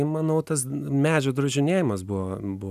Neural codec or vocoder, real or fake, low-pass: none; real; 14.4 kHz